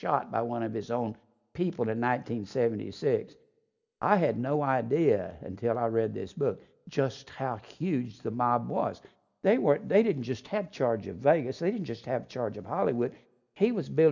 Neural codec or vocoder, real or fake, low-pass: none; real; 7.2 kHz